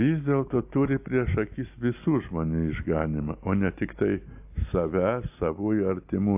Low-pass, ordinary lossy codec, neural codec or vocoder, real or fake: 3.6 kHz; AAC, 32 kbps; none; real